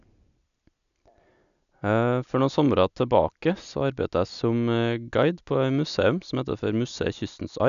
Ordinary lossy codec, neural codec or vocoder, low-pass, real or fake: none; none; 7.2 kHz; real